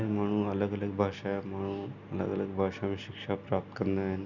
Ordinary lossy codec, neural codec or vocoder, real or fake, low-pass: none; none; real; 7.2 kHz